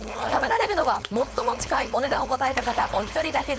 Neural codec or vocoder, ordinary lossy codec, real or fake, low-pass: codec, 16 kHz, 4.8 kbps, FACodec; none; fake; none